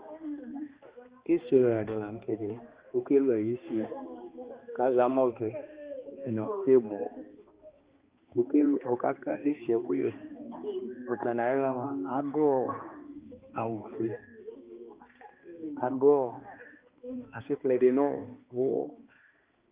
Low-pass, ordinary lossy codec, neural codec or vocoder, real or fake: 3.6 kHz; Opus, 32 kbps; codec, 16 kHz, 2 kbps, X-Codec, HuBERT features, trained on balanced general audio; fake